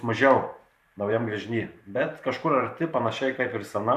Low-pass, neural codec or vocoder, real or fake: 14.4 kHz; vocoder, 44.1 kHz, 128 mel bands every 512 samples, BigVGAN v2; fake